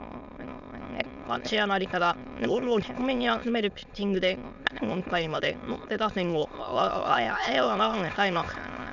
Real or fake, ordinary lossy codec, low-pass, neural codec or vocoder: fake; none; 7.2 kHz; autoencoder, 22.05 kHz, a latent of 192 numbers a frame, VITS, trained on many speakers